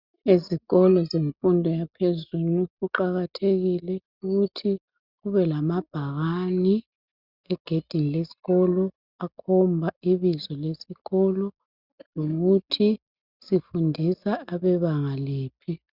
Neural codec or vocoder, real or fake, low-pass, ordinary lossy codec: none; real; 5.4 kHz; Opus, 64 kbps